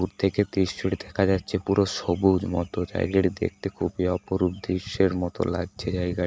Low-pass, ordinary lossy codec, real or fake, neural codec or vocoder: none; none; real; none